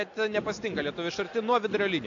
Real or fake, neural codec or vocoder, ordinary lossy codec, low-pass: real; none; AAC, 48 kbps; 7.2 kHz